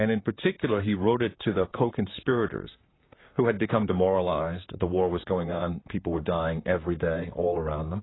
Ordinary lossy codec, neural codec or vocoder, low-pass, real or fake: AAC, 16 kbps; vocoder, 44.1 kHz, 128 mel bands, Pupu-Vocoder; 7.2 kHz; fake